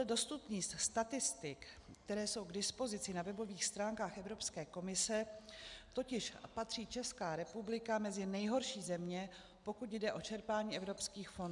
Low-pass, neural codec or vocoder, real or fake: 10.8 kHz; none; real